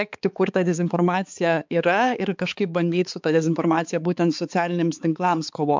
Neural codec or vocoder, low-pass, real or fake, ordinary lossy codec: codec, 16 kHz, 4 kbps, X-Codec, HuBERT features, trained on balanced general audio; 7.2 kHz; fake; MP3, 64 kbps